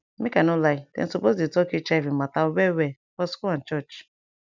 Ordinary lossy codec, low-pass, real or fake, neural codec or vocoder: none; 7.2 kHz; real; none